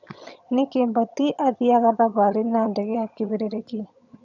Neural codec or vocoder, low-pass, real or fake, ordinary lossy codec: vocoder, 22.05 kHz, 80 mel bands, HiFi-GAN; 7.2 kHz; fake; none